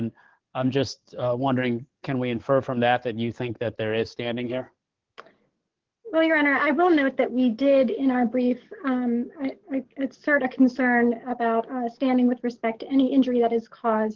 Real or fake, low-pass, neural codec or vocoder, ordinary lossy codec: fake; 7.2 kHz; codec, 44.1 kHz, 7.8 kbps, DAC; Opus, 16 kbps